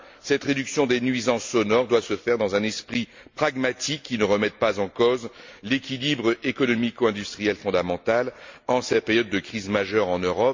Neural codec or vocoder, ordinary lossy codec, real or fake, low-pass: none; MP3, 48 kbps; real; 7.2 kHz